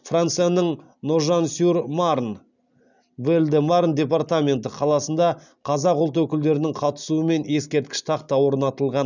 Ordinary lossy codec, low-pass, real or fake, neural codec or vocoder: none; 7.2 kHz; real; none